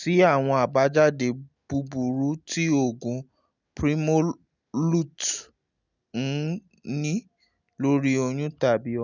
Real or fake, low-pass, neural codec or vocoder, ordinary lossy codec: real; 7.2 kHz; none; none